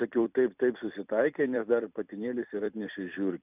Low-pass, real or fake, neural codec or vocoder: 3.6 kHz; fake; vocoder, 44.1 kHz, 128 mel bands every 256 samples, BigVGAN v2